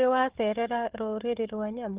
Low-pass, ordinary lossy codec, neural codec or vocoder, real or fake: 3.6 kHz; Opus, 16 kbps; codec, 16 kHz, 4 kbps, FunCodec, trained on Chinese and English, 50 frames a second; fake